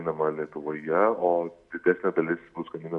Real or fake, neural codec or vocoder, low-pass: real; none; 10.8 kHz